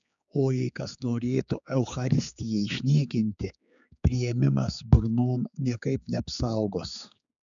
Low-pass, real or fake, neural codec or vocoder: 7.2 kHz; fake; codec, 16 kHz, 4 kbps, X-Codec, HuBERT features, trained on general audio